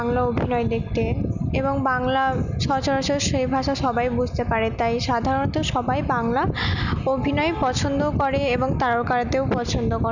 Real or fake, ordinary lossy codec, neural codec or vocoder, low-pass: real; none; none; 7.2 kHz